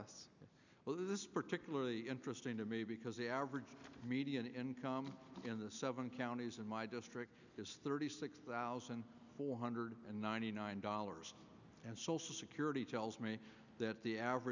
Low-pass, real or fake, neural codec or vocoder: 7.2 kHz; real; none